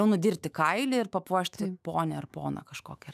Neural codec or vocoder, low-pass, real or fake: autoencoder, 48 kHz, 128 numbers a frame, DAC-VAE, trained on Japanese speech; 14.4 kHz; fake